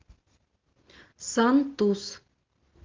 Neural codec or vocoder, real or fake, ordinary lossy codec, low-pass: none; real; Opus, 32 kbps; 7.2 kHz